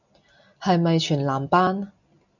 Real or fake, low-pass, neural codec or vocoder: real; 7.2 kHz; none